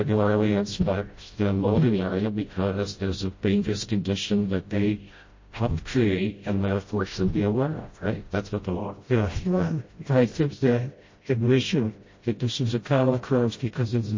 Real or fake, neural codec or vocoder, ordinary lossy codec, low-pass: fake; codec, 16 kHz, 0.5 kbps, FreqCodec, smaller model; MP3, 32 kbps; 7.2 kHz